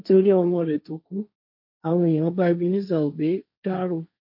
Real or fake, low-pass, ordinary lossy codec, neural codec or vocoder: fake; 5.4 kHz; MP3, 48 kbps; codec, 16 kHz, 1.1 kbps, Voila-Tokenizer